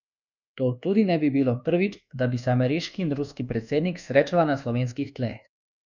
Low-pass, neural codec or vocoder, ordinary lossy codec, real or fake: 7.2 kHz; codec, 24 kHz, 1.2 kbps, DualCodec; none; fake